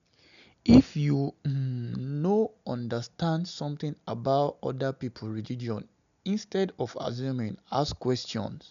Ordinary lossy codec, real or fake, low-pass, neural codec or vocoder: none; real; 7.2 kHz; none